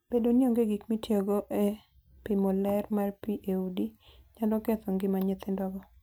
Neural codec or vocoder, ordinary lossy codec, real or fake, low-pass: none; none; real; none